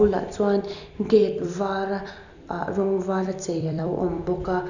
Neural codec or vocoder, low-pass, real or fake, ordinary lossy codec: vocoder, 44.1 kHz, 128 mel bands every 256 samples, BigVGAN v2; 7.2 kHz; fake; none